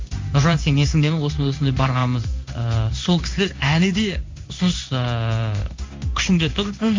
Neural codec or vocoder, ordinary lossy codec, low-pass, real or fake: codec, 16 kHz in and 24 kHz out, 1 kbps, XY-Tokenizer; MP3, 64 kbps; 7.2 kHz; fake